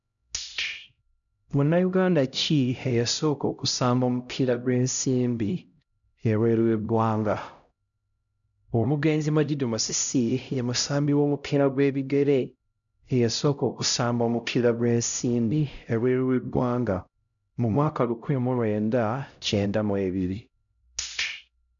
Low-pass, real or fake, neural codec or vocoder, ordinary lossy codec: 7.2 kHz; fake; codec, 16 kHz, 0.5 kbps, X-Codec, HuBERT features, trained on LibriSpeech; none